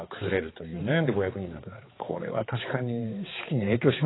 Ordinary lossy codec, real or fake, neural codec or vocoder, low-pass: AAC, 16 kbps; fake; codec, 16 kHz, 4 kbps, X-Codec, HuBERT features, trained on balanced general audio; 7.2 kHz